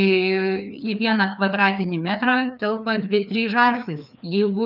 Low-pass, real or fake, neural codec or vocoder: 5.4 kHz; fake; codec, 16 kHz, 2 kbps, FreqCodec, larger model